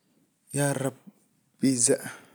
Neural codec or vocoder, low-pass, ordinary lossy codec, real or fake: none; none; none; real